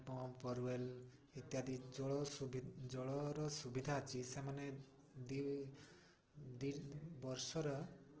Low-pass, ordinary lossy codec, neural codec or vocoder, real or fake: 7.2 kHz; Opus, 16 kbps; none; real